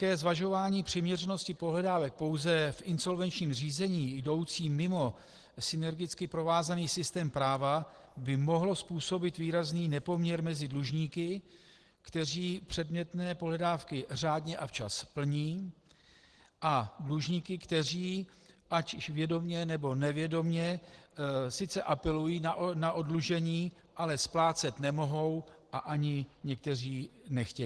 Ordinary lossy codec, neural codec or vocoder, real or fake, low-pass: Opus, 16 kbps; none; real; 10.8 kHz